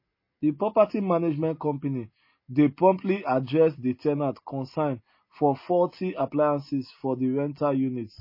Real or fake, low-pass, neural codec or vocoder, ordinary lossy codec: real; 5.4 kHz; none; MP3, 24 kbps